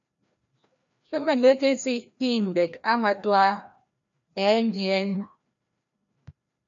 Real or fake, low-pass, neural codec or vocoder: fake; 7.2 kHz; codec, 16 kHz, 1 kbps, FreqCodec, larger model